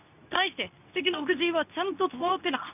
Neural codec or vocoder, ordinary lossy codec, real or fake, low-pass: codec, 24 kHz, 0.9 kbps, WavTokenizer, medium speech release version 2; none; fake; 3.6 kHz